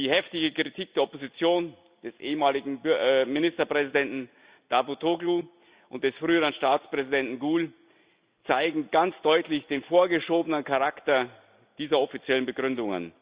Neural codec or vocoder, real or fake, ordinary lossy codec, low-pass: none; real; Opus, 64 kbps; 3.6 kHz